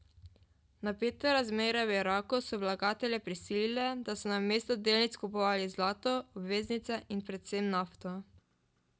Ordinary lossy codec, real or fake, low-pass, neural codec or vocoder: none; real; none; none